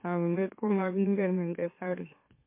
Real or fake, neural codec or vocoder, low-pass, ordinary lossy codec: fake; autoencoder, 44.1 kHz, a latent of 192 numbers a frame, MeloTTS; 3.6 kHz; MP3, 24 kbps